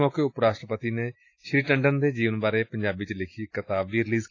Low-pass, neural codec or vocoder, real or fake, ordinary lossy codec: 7.2 kHz; none; real; AAC, 32 kbps